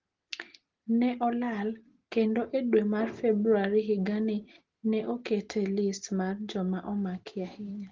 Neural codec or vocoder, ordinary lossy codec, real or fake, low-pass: none; Opus, 16 kbps; real; 7.2 kHz